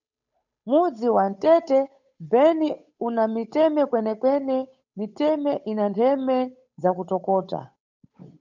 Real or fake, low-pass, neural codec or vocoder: fake; 7.2 kHz; codec, 16 kHz, 8 kbps, FunCodec, trained on Chinese and English, 25 frames a second